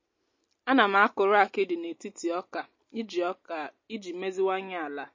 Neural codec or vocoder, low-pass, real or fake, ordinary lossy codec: none; 7.2 kHz; real; MP3, 32 kbps